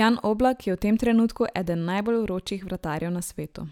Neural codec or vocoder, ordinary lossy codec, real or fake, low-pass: none; none; real; 19.8 kHz